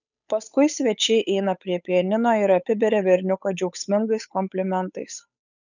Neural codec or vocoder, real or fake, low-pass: codec, 16 kHz, 8 kbps, FunCodec, trained on Chinese and English, 25 frames a second; fake; 7.2 kHz